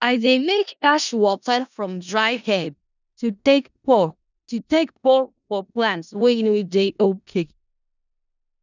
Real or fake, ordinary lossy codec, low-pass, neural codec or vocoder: fake; none; 7.2 kHz; codec, 16 kHz in and 24 kHz out, 0.4 kbps, LongCat-Audio-Codec, four codebook decoder